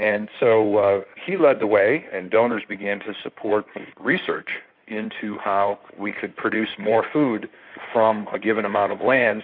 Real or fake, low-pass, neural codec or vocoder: fake; 5.4 kHz; codec, 16 kHz in and 24 kHz out, 2.2 kbps, FireRedTTS-2 codec